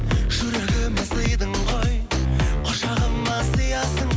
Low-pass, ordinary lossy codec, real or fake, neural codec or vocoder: none; none; real; none